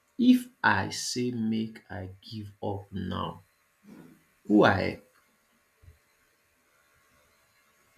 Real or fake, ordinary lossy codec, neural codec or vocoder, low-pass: real; none; none; 14.4 kHz